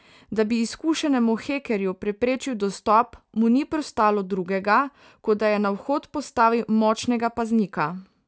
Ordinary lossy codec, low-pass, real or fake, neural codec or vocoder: none; none; real; none